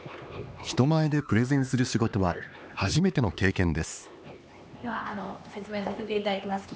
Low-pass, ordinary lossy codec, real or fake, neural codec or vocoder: none; none; fake; codec, 16 kHz, 2 kbps, X-Codec, HuBERT features, trained on LibriSpeech